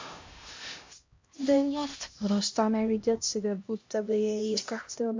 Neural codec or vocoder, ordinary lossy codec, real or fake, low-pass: codec, 16 kHz, 1 kbps, X-Codec, HuBERT features, trained on LibriSpeech; MP3, 48 kbps; fake; 7.2 kHz